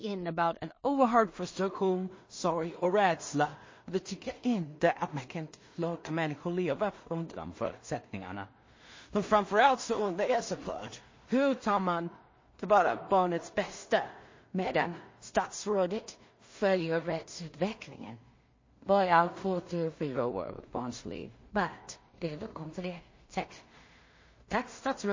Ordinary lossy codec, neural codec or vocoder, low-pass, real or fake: MP3, 32 kbps; codec, 16 kHz in and 24 kHz out, 0.4 kbps, LongCat-Audio-Codec, two codebook decoder; 7.2 kHz; fake